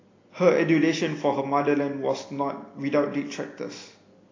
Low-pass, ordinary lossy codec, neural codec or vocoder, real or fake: 7.2 kHz; AAC, 32 kbps; none; real